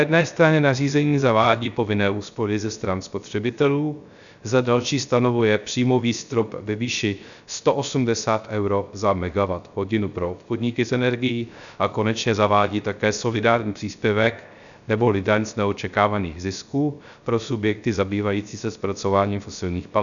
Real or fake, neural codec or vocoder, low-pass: fake; codec, 16 kHz, 0.3 kbps, FocalCodec; 7.2 kHz